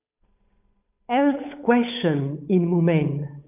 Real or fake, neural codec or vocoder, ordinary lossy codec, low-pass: fake; codec, 16 kHz, 8 kbps, FunCodec, trained on Chinese and English, 25 frames a second; AAC, 32 kbps; 3.6 kHz